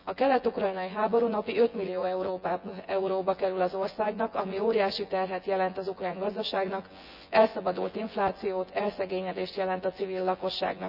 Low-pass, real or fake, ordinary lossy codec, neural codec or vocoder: 5.4 kHz; fake; none; vocoder, 24 kHz, 100 mel bands, Vocos